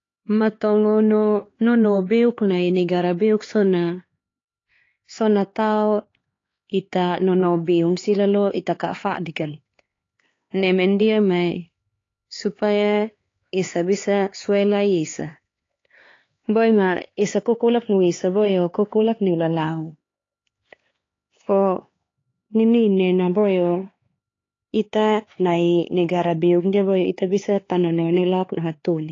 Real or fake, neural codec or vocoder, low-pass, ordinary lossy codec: fake; codec, 16 kHz, 4 kbps, X-Codec, HuBERT features, trained on LibriSpeech; 7.2 kHz; AAC, 32 kbps